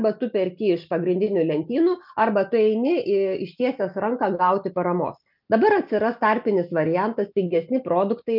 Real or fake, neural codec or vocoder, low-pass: real; none; 5.4 kHz